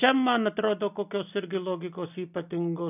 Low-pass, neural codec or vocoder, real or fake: 3.6 kHz; none; real